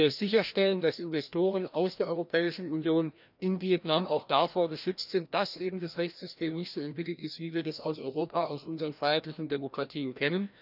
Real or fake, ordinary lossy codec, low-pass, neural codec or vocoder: fake; none; 5.4 kHz; codec, 16 kHz, 1 kbps, FreqCodec, larger model